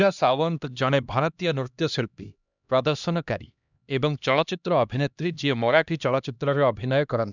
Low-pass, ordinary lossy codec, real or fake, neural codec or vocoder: 7.2 kHz; none; fake; codec, 16 kHz, 1 kbps, X-Codec, HuBERT features, trained on LibriSpeech